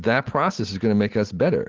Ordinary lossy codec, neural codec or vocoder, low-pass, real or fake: Opus, 16 kbps; none; 7.2 kHz; real